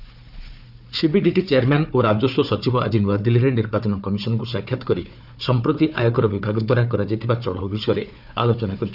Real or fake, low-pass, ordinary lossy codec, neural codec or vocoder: fake; 5.4 kHz; none; codec, 16 kHz, 4 kbps, FunCodec, trained on Chinese and English, 50 frames a second